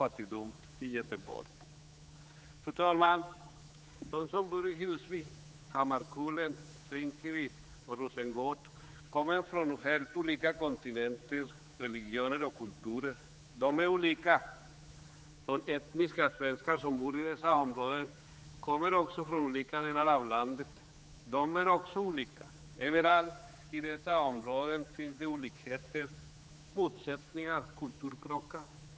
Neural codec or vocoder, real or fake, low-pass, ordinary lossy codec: codec, 16 kHz, 4 kbps, X-Codec, HuBERT features, trained on general audio; fake; none; none